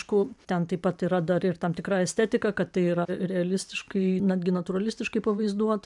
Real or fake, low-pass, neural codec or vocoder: fake; 10.8 kHz; vocoder, 44.1 kHz, 128 mel bands every 512 samples, BigVGAN v2